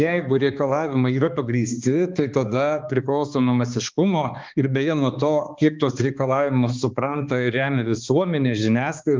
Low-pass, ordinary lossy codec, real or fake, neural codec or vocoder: 7.2 kHz; Opus, 24 kbps; fake; codec, 16 kHz, 2 kbps, X-Codec, HuBERT features, trained on balanced general audio